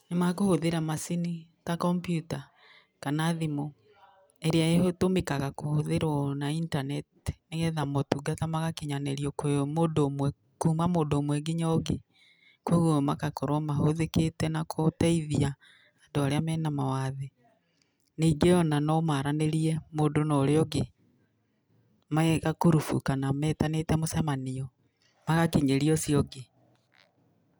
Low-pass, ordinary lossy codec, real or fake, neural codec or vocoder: none; none; real; none